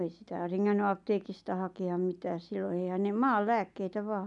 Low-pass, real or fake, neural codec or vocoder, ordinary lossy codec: 10.8 kHz; real; none; MP3, 96 kbps